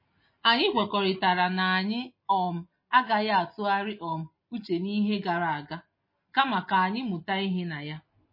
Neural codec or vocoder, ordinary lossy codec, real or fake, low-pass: none; MP3, 24 kbps; real; 5.4 kHz